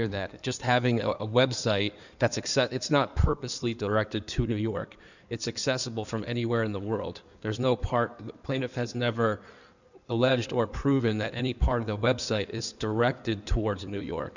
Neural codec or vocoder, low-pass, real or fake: codec, 16 kHz in and 24 kHz out, 2.2 kbps, FireRedTTS-2 codec; 7.2 kHz; fake